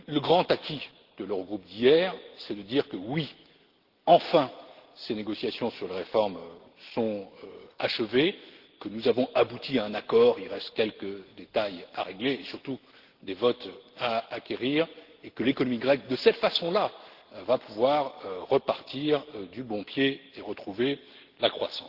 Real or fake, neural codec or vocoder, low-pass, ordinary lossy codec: real; none; 5.4 kHz; Opus, 16 kbps